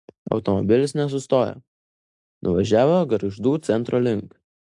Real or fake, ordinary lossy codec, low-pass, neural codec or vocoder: real; AAC, 64 kbps; 10.8 kHz; none